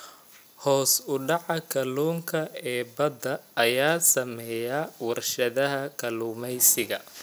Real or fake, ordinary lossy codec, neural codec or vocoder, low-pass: real; none; none; none